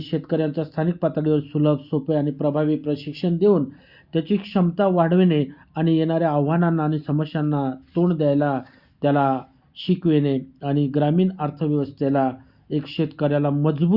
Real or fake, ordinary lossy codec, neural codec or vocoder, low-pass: real; Opus, 64 kbps; none; 5.4 kHz